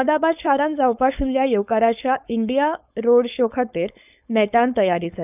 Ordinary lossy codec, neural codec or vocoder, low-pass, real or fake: none; codec, 16 kHz, 4.8 kbps, FACodec; 3.6 kHz; fake